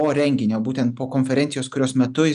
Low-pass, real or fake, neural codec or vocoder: 10.8 kHz; real; none